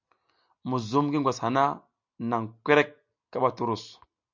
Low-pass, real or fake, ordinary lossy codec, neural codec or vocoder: 7.2 kHz; real; MP3, 64 kbps; none